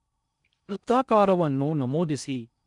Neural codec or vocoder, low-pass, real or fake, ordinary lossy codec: codec, 16 kHz in and 24 kHz out, 0.6 kbps, FocalCodec, streaming, 4096 codes; 10.8 kHz; fake; none